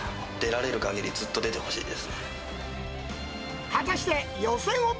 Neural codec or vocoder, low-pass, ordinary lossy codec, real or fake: none; none; none; real